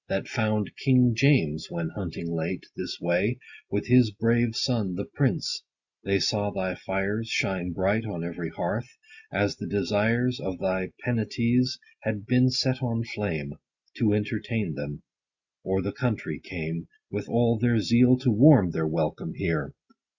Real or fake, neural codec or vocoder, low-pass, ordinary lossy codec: real; none; 7.2 kHz; Opus, 64 kbps